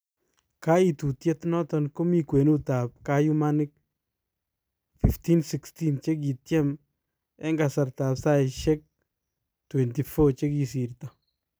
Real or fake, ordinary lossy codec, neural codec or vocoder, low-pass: real; none; none; none